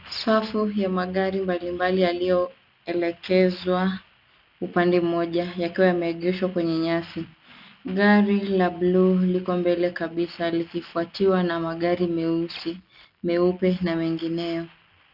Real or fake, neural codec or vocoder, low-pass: real; none; 5.4 kHz